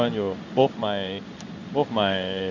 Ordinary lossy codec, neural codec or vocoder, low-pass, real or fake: none; codec, 16 kHz in and 24 kHz out, 1 kbps, XY-Tokenizer; 7.2 kHz; fake